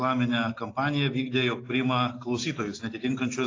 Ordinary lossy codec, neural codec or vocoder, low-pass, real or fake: AAC, 32 kbps; none; 7.2 kHz; real